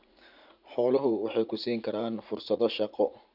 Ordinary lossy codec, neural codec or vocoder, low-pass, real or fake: none; vocoder, 44.1 kHz, 80 mel bands, Vocos; 5.4 kHz; fake